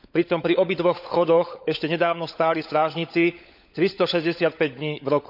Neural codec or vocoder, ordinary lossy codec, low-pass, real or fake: codec, 16 kHz, 16 kbps, FunCodec, trained on LibriTTS, 50 frames a second; none; 5.4 kHz; fake